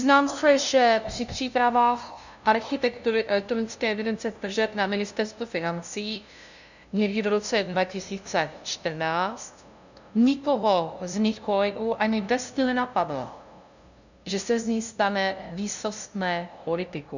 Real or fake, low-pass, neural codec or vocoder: fake; 7.2 kHz; codec, 16 kHz, 0.5 kbps, FunCodec, trained on LibriTTS, 25 frames a second